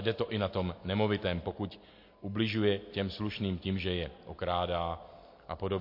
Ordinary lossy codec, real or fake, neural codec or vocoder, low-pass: MP3, 32 kbps; real; none; 5.4 kHz